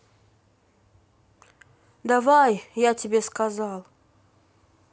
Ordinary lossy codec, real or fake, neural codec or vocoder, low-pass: none; real; none; none